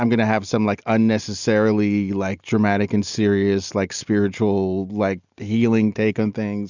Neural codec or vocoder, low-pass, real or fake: none; 7.2 kHz; real